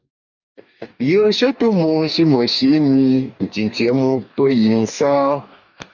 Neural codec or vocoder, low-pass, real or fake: codec, 44.1 kHz, 2.6 kbps, DAC; 7.2 kHz; fake